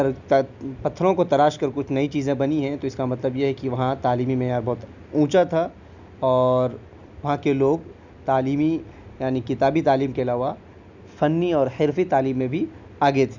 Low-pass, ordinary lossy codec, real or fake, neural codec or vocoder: 7.2 kHz; none; real; none